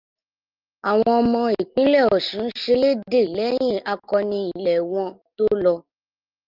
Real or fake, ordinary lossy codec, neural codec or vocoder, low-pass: real; Opus, 24 kbps; none; 5.4 kHz